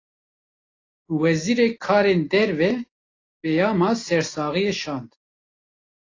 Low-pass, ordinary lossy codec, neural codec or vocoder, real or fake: 7.2 kHz; AAC, 32 kbps; none; real